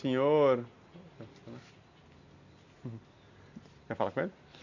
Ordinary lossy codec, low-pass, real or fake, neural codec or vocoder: none; 7.2 kHz; real; none